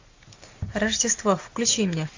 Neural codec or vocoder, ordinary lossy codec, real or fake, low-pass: none; AAC, 48 kbps; real; 7.2 kHz